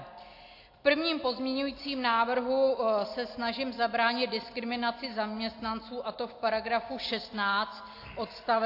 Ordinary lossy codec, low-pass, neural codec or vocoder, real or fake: AAC, 32 kbps; 5.4 kHz; none; real